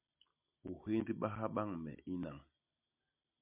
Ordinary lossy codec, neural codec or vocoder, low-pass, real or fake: MP3, 32 kbps; none; 3.6 kHz; real